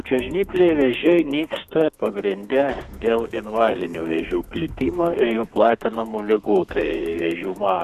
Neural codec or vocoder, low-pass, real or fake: codec, 32 kHz, 1.9 kbps, SNAC; 14.4 kHz; fake